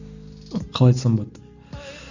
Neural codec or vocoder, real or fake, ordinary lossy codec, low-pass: none; real; none; 7.2 kHz